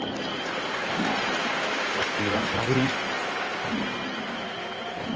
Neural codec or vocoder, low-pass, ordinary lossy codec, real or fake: codec, 16 kHz in and 24 kHz out, 2.2 kbps, FireRedTTS-2 codec; 7.2 kHz; Opus, 24 kbps; fake